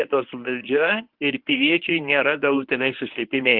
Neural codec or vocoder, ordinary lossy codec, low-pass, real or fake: codec, 24 kHz, 0.9 kbps, WavTokenizer, medium speech release version 1; Opus, 32 kbps; 5.4 kHz; fake